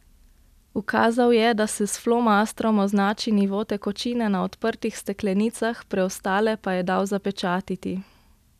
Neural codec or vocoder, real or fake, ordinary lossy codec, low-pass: none; real; none; 14.4 kHz